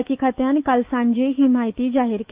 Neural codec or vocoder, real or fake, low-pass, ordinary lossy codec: autoencoder, 48 kHz, 128 numbers a frame, DAC-VAE, trained on Japanese speech; fake; 3.6 kHz; Opus, 24 kbps